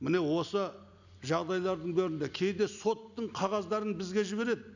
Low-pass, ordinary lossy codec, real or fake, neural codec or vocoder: 7.2 kHz; none; real; none